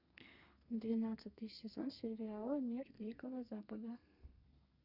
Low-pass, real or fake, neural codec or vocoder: 5.4 kHz; fake; codec, 32 kHz, 1.9 kbps, SNAC